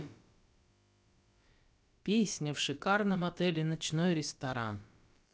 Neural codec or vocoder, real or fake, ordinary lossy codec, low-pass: codec, 16 kHz, about 1 kbps, DyCAST, with the encoder's durations; fake; none; none